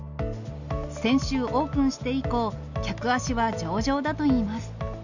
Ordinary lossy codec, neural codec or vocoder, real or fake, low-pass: none; none; real; 7.2 kHz